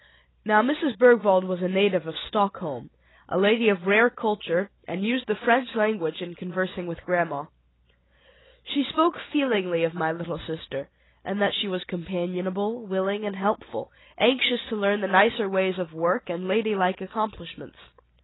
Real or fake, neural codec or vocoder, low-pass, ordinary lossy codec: real; none; 7.2 kHz; AAC, 16 kbps